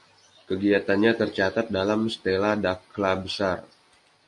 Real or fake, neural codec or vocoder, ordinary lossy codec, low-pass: real; none; MP3, 48 kbps; 10.8 kHz